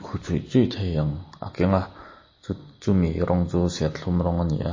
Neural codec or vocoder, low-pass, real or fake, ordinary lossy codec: none; 7.2 kHz; real; MP3, 32 kbps